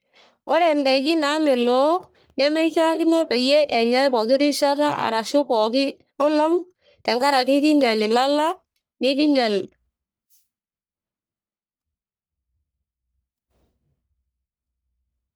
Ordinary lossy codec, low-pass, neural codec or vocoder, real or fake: none; none; codec, 44.1 kHz, 1.7 kbps, Pupu-Codec; fake